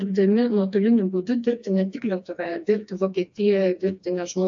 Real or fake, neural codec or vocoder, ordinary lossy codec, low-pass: fake; codec, 16 kHz, 2 kbps, FreqCodec, smaller model; AAC, 64 kbps; 7.2 kHz